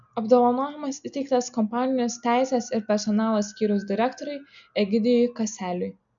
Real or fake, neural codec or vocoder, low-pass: real; none; 7.2 kHz